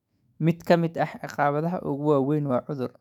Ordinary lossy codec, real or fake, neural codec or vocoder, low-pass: none; fake; autoencoder, 48 kHz, 128 numbers a frame, DAC-VAE, trained on Japanese speech; 19.8 kHz